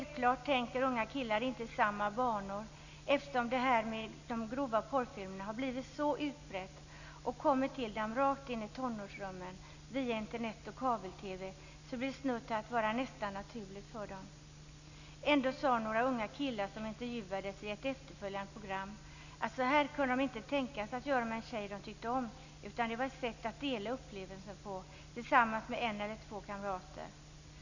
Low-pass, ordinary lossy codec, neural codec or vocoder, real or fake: 7.2 kHz; none; none; real